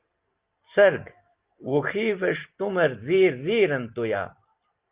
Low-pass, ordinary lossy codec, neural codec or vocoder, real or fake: 3.6 kHz; Opus, 24 kbps; none; real